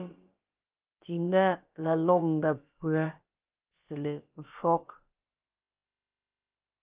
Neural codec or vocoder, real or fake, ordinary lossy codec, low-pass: codec, 16 kHz, about 1 kbps, DyCAST, with the encoder's durations; fake; Opus, 24 kbps; 3.6 kHz